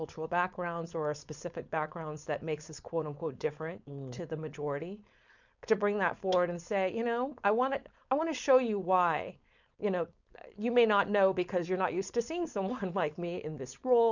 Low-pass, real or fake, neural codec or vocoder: 7.2 kHz; fake; codec, 16 kHz, 4.8 kbps, FACodec